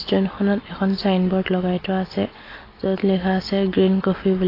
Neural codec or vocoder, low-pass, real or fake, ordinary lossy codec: none; 5.4 kHz; real; AAC, 24 kbps